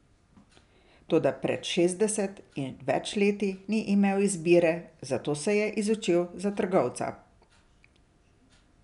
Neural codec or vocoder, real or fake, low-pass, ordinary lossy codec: none; real; 10.8 kHz; none